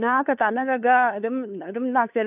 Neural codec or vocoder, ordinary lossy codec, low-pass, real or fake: codec, 16 kHz, 2 kbps, FunCodec, trained on LibriTTS, 25 frames a second; AAC, 32 kbps; 3.6 kHz; fake